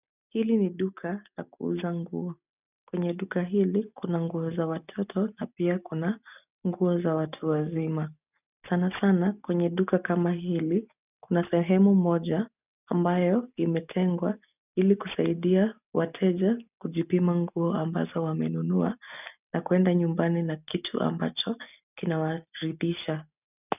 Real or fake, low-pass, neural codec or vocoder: real; 3.6 kHz; none